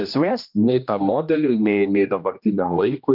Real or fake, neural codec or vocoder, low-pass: fake; codec, 16 kHz, 1 kbps, X-Codec, HuBERT features, trained on general audio; 5.4 kHz